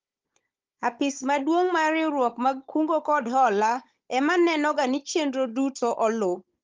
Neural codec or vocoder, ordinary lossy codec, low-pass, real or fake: codec, 16 kHz, 16 kbps, FunCodec, trained on Chinese and English, 50 frames a second; Opus, 32 kbps; 7.2 kHz; fake